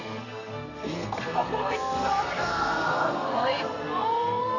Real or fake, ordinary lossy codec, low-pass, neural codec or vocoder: fake; none; 7.2 kHz; codec, 32 kHz, 1.9 kbps, SNAC